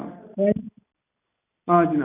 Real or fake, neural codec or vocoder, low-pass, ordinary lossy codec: real; none; 3.6 kHz; none